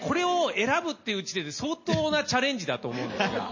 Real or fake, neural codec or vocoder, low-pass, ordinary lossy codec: real; none; 7.2 kHz; MP3, 32 kbps